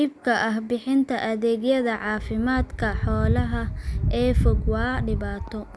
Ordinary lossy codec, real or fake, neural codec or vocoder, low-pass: none; real; none; none